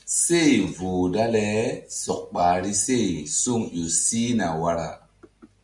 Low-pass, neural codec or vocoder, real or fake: 10.8 kHz; none; real